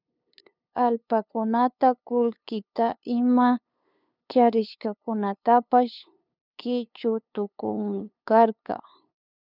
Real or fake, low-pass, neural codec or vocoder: fake; 5.4 kHz; codec, 16 kHz, 2 kbps, FunCodec, trained on LibriTTS, 25 frames a second